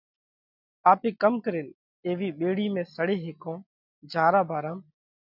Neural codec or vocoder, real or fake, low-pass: none; real; 5.4 kHz